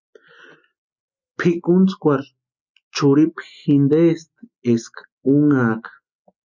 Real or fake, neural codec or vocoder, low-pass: real; none; 7.2 kHz